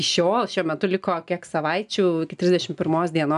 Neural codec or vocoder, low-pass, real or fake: none; 10.8 kHz; real